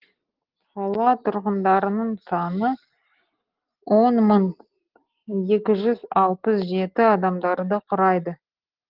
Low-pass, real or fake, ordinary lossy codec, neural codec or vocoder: 5.4 kHz; real; Opus, 16 kbps; none